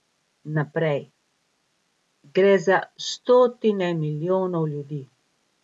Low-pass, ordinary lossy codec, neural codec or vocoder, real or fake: none; none; none; real